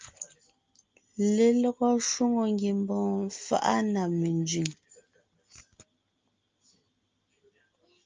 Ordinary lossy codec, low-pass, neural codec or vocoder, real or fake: Opus, 24 kbps; 7.2 kHz; none; real